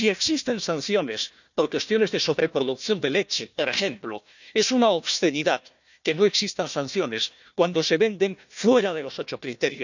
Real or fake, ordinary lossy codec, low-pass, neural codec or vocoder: fake; none; 7.2 kHz; codec, 16 kHz, 1 kbps, FunCodec, trained on Chinese and English, 50 frames a second